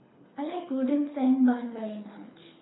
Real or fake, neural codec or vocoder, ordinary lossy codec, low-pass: fake; codec, 24 kHz, 6 kbps, HILCodec; AAC, 16 kbps; 7.2 kHz